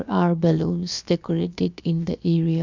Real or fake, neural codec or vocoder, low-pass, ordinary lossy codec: fake; codec, 16 kHz, about 1 kbps, DyCAST, with the encoder's durations; 7.2 kHz; none